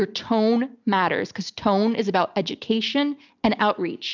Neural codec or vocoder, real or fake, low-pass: none; real; 7.2 kHz